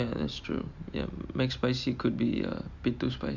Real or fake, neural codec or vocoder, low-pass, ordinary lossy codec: real; none; 7.2 kHz; Opus, 64 kbps